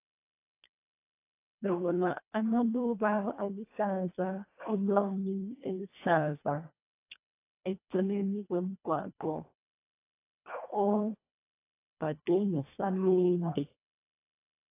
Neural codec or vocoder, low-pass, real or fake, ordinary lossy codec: codec, 24 kHz, 1.5 kbps, HILCodec; 3.6 kHz; fake; AAC, 24 kbps